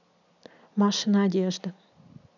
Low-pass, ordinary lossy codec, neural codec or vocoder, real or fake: 7.2 kHz; none; none; real